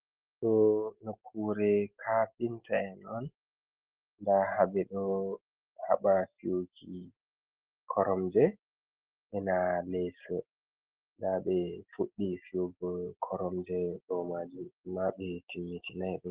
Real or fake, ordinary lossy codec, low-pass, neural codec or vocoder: real; Opus, 16 kbps; 3.6 kHz; none